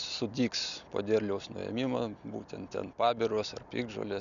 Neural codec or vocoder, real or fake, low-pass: none; real; 7.2 kHz